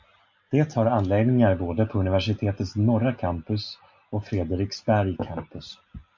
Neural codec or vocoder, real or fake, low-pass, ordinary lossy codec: none; real; 7.2 kHz; MP3, 48 kbps